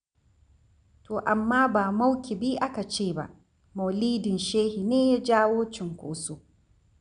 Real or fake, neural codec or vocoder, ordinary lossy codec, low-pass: real; none; none; 9.9 kHz